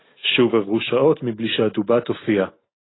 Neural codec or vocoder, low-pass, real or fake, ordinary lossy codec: none; 7.2 kHz; real; AAC, 16 kbps